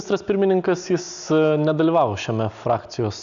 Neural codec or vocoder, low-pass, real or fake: none; 7.2 kHz; real